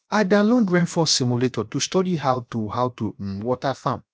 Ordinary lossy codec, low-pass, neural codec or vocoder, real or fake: none; none; codec, 16 kHz, 0.7 kbps, FocalCodec; fake